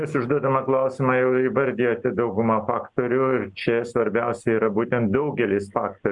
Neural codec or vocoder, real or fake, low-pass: none; real; 10.8 kHz